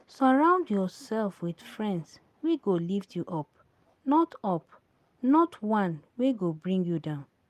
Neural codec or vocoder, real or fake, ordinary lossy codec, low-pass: none; real; Opus, 32 kbps; 14.4 kHz